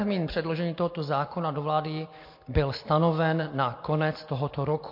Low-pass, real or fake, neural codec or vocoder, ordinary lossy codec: 5.4 kHz; real; none; MP3, 32 kbps